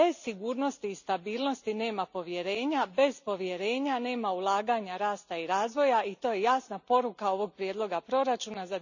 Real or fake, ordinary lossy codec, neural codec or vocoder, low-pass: real; none; none; 7.2 kHz